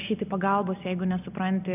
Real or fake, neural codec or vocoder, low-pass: real; none; 3.6 kHz